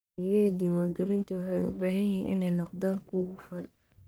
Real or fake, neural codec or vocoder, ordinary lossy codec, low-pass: fake; codec, 44.1 kHz, 1.7 kbps, Pupu-Codec; none; none